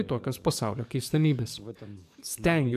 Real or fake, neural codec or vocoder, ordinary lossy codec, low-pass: fake; codec, 44.1 kHz, 7.8 kbps, DAC; MP3, 96 kbps; 14.4 kHz